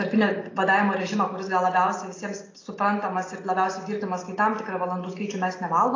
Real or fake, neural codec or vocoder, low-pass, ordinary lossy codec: real; none; 7.2 kHz; AAC, 32 kbps